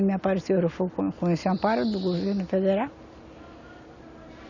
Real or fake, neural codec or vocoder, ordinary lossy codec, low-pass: real; none; none; 7.2 kHz